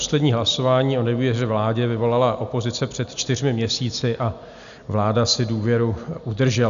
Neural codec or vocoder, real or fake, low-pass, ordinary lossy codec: none; real; 7.2 kHz; AAC, 96 kbps